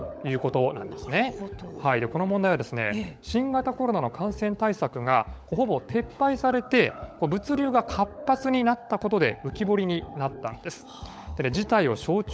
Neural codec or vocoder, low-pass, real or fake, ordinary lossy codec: codec, 16 kHz, 16 kbps, FunCodec, trained on LibriTTS, 50 frames a second; none; fake; none